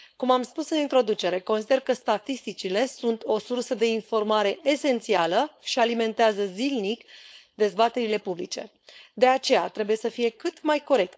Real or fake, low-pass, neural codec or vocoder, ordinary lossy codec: fake; none; codec, 16 kHz, 4.8 kbps, FACodec; none